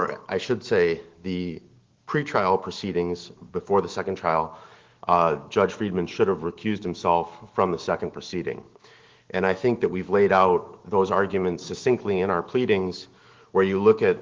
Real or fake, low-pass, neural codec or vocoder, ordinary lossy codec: fake; 7.2 kHz; autoencoder, 48 kHz, 128 numbers a frame, DAC-VAE, trained on Japanese speech; Opus, 16 kbps